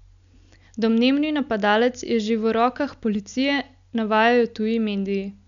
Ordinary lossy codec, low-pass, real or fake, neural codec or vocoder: none; 7.2 kHz; real; none